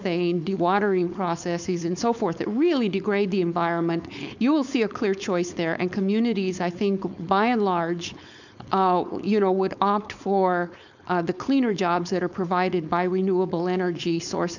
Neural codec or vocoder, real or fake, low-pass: codec, 16 kHz, 4.8 kbps, FACodec; fake; 7.2 kHz